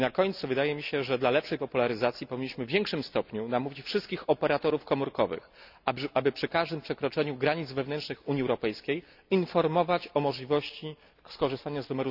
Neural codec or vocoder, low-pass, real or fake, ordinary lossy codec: none; 5.4 kHz; real; none